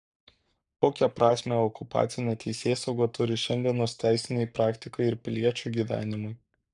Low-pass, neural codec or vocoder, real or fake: 10.8 kHz; codec, 44.1 kHz, 7.8 kbps, Pupu-Codec; fake